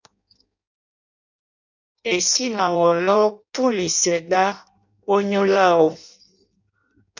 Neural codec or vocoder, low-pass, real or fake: codec, 16 kHz in and 24 kHz out, 0.6 kbps, FireRedTTS-2 codec; 7.2 kHz; fake